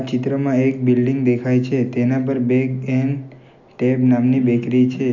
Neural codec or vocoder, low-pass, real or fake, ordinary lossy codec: none; 7.2 kHz; real; none